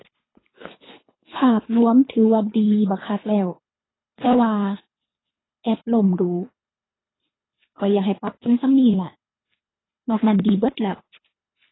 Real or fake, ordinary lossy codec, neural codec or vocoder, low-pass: fake; AAC, 16 kbps; codec, 24 kHz, 3 kbps, HILCodec; 7.2 kHz